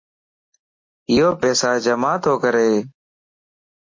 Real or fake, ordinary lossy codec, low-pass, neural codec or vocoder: real; MP3, 32 kbps; 7.2 kHz; none